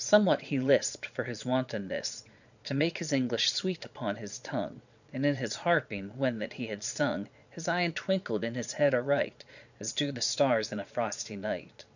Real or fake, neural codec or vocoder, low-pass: fake; vocoder, 22.05 kHz, 80 mel bands, Vocos; 7.2 kHz